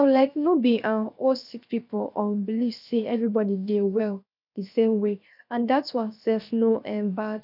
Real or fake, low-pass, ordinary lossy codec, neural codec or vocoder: fake; 5.4 kHz; none; codec, 16 kHz, 0.7 kbps, FocalCodec